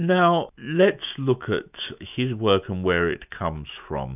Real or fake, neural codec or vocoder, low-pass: fake; autoencoder, 48 kHz, 128 numbers a frame, DAC-VAE, trained on Japanese speech; 3.6 kHz